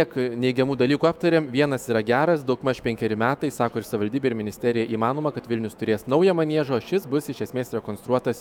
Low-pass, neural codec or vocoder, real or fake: 19.8 kHz; autoencoder, 48 kHz, 128 numbers a frame, DAC-VAE, trained on Japanese speech; fake